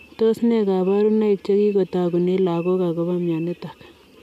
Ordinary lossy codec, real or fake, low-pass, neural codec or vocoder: none; real; 14.4 kHz; none